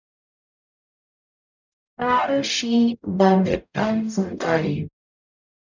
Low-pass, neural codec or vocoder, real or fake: 7.2 kHz; codec, 44.1 kHz, 0.9 kbps, DAC; fake